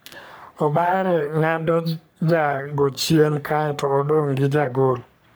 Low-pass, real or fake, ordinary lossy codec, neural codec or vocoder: none; fake; none; codec, 44.1 kHz, 3.4 kbps, Pupu-Codec